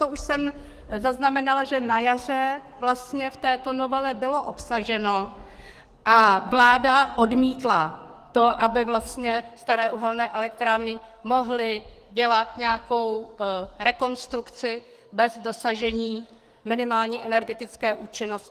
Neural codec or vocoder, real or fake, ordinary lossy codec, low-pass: codec, 44.1 kHz, 2.6 kbps, SNAC; fake; Opus, 24 kbps; 14.4 kHz